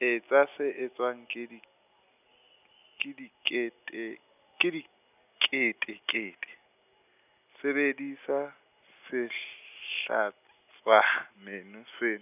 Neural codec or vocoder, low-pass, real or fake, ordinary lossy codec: none; 3.6 kHz; real; none